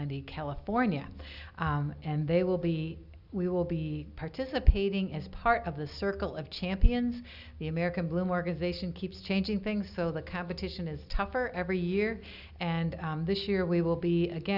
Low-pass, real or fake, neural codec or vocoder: 5.4 kHz; real; none